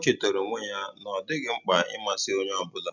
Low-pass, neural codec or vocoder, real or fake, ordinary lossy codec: 7.2 kHz; none; real; none